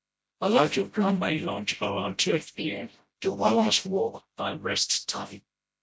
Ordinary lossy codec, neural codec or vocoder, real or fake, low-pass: none; codec, 16 kHz, 0.5 kbps, FreqCodec, smaller model; fake; none